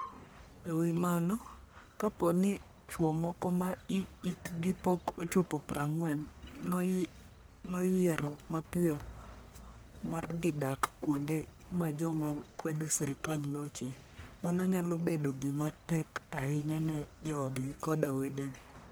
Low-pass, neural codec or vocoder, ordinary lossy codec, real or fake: none; codec, 44.1 kHz, 1.7 kbps, Pupu-Codec; none; fake